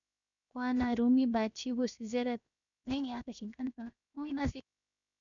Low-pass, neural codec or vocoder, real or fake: 7.2 kHz; codec, 16 kHz, 0.7 kbps, FocalCodec; fake